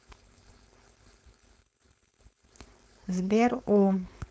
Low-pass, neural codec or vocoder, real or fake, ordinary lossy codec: none; codec, 16 kHz, 4.8 kbps, FACodec; fake; none